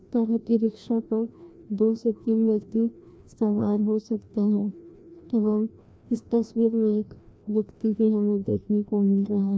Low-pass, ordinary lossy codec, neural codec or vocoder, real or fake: none; none; codec, 16 kHz, 1 kbps, FreqCodec, larger model; fake